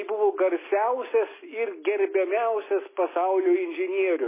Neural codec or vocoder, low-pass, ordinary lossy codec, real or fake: none; 3.6 kHz; MP3, 16 kbps; real